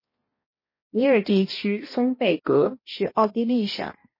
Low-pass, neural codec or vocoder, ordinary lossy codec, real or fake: 5.4 kHz; codec, 16 kHz, 1 kbps, X-Codec, HuBERT features, trained on balanced general audio; MP3, 24 kbps; fake